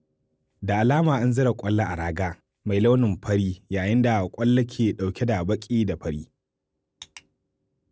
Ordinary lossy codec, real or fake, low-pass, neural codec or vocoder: none; real; none; none